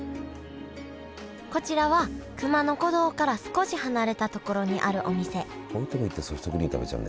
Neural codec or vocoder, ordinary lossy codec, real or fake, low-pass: none; none; real; none